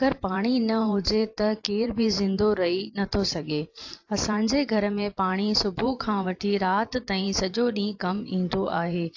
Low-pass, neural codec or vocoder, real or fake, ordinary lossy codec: 7.2 kHz; vocoder, 44.1 kHz, 128 mel bands every 512 samples, BigVGAN v2; fake; AAC, 48 kbps